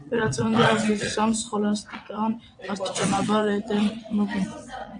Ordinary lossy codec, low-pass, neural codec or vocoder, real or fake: AAC, 64 kbps; 9.9 kHz; vocoder, 22.05 kHz, 80 mel bands, WaveNeXt; fake